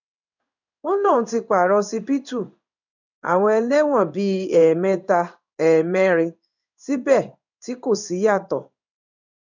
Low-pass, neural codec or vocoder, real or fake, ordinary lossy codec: 7.2 kHz; codec, 16 kHz in and 24 kHz out, 1 kbps, XY-Tokenizer; fake; none